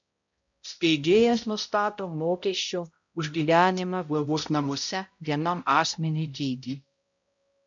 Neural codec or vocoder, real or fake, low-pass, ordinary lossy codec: codec, 16 kHz, 0.5 kbps, X-Codec, HuBERT features, trained on balanced general audio; fake; 7.2 kHz; MP3, 48 kbps